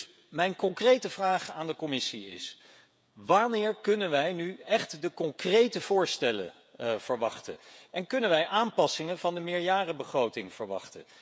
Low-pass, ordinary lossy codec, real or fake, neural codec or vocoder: none; none; fake; codec, 16 kHz, 16 kbps, FreqCodec, smaller model